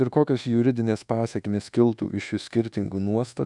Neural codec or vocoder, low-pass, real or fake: codec, 24 kHz, 1.2 kbps, DualCodec; 10.8 kHz; fake